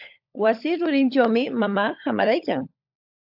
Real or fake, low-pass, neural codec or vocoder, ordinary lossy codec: fake; 5.4 kHz; codec, 16 kHz, 16 kbps, FunCodec, trained on LibriTTS, 50 frames a second; AAC, 48 kbps